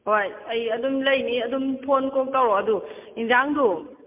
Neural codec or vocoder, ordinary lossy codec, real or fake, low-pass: none; MP3, 32 kbps; real; 3.6 kHz